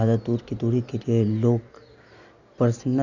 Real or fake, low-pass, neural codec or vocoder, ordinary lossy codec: real; 7.2 kHz; none; none